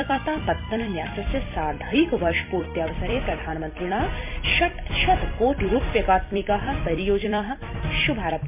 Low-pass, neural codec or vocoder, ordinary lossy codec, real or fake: 3.6 kHz; vocoder, 44.1 kHz, 128 mel bands every 256 samples, BigVGAN v2; MP3, 32 kbps; fake